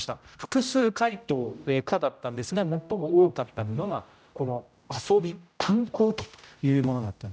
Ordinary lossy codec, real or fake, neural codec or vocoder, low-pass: none; fake; codec, 16 kHz, 0.5 kbps, X-Codec, HuBERT features, trained on general audio; none